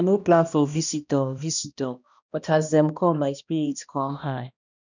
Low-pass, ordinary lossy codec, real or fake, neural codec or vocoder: 7.2 kHz; none; fake; codec, 16 kHz, 1 kbps, X-Codec, HuBERT features, trained on balanced general audio